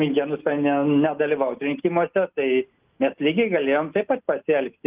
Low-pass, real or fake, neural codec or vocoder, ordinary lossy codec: 3.6 kHz; real; none; Opus, 24 kbps